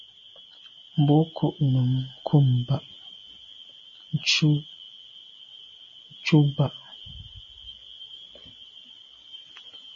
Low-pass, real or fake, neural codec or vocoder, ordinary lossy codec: 7.2 kHz; real; none; MP3, 32 kbps